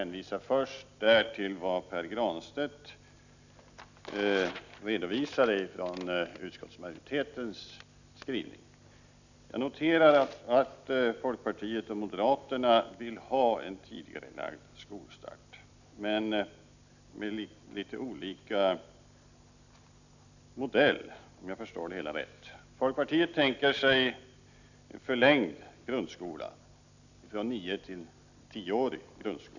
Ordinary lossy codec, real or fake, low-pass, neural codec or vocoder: none; real; 7.2 kHz; none